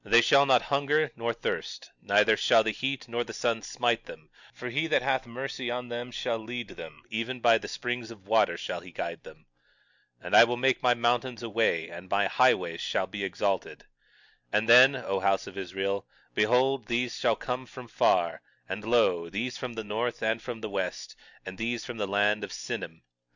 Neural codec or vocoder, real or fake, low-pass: none; real; 7.2 kHz